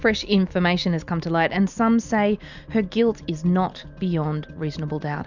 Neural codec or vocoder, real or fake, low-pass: none; real; 7.2 kHz